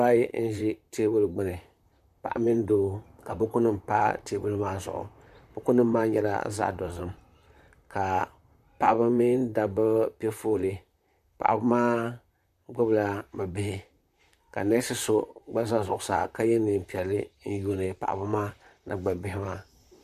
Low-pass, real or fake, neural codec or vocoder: 14.4 kHz; fake; vocoder, 44.1 kHz, 128 mel bands, Pupu-Vocoder